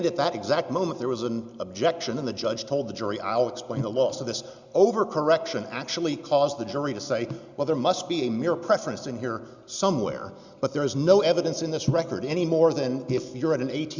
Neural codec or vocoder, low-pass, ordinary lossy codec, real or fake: none; 7.2 kHz; Opus, 64 kbps; real